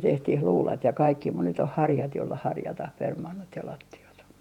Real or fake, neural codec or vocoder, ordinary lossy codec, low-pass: fake; vocoder, 48 kHz, 128 mel bands, Vocos; none; 19.8 kHz